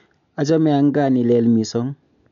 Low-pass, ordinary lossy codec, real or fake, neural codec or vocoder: 7.2 kHz; none; real; none